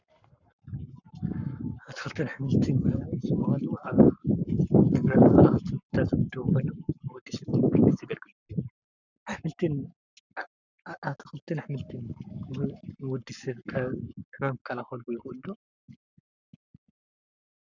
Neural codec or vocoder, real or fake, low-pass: codec, 44.1 kHz, 7.8 kbps, Pupu-Codec; fake; 7.2 kHz